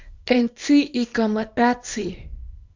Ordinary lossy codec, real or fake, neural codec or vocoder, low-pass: MP3, 64 kbps; fake; codec, 24 kHz, 0.9 kbps, WavTokenizer, small release; 7.2 kHz